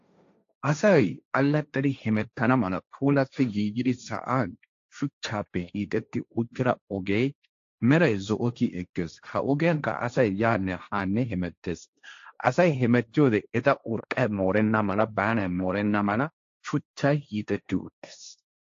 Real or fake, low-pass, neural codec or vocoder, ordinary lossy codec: fake; 7.2 kHz; codec, 16 kHz, 1.1 kbps, Voila-Tokenizer; AAC, 48 kbps